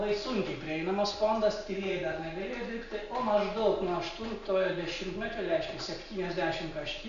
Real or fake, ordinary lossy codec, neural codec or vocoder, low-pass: real; Opus, 64 kbps; none; 7.2 kHz